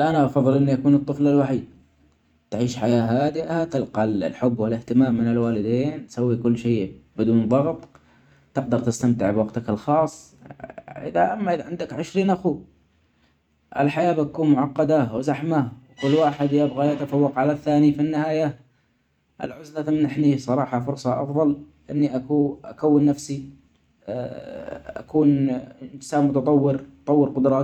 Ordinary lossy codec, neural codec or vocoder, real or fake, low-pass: none; vocoder, 44.1 kHz, 128 mel bands every 512 samples, BigVGAN v2; fake; 19.8 kHz